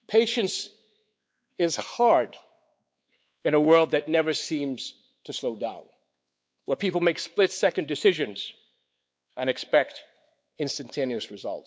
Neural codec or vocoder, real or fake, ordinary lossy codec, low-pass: codec, 16 kHz, 4 kbps, X-Codec, WavLM features, trained on Multilingual LibriSpeech; fake; none; none